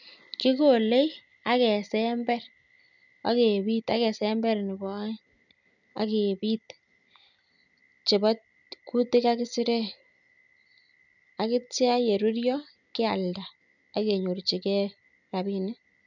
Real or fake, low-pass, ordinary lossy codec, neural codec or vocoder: real; 7.2 kHz; none; none